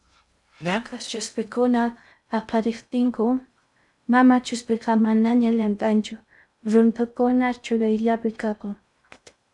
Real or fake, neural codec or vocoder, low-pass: fake; codec, 16 kHz in and 24 kHz out, 0.6 kbps, FocalCodec, streaming, 2048 codes; 10.8 kHz